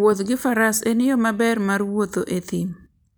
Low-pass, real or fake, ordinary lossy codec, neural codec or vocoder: none; real; none; none